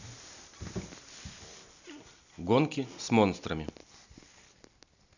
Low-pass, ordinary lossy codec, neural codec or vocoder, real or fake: 7.2 kHz; none; none; real